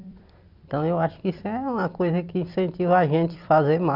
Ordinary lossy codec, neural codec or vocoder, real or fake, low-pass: none; vocoder, 44.1 kHz, 128 mel bands every 256 samples, BigVGAN v2; fake; 5.4 kHz